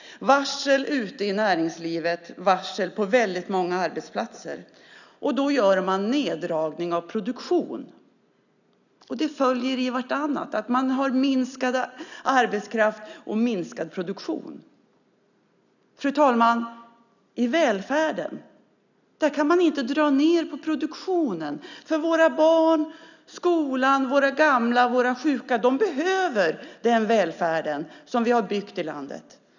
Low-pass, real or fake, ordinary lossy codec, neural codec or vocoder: 7.2 kHz; real; none; none